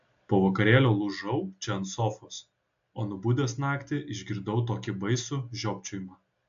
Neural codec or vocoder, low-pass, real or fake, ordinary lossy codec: none; 7.2 kHz; real; AAC, 64 kbps